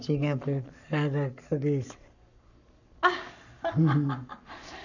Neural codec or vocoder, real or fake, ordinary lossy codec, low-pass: codec, 44.1 kHz, 7.8 kbps, Pupu-Codec; fake; none; 7.2 kHz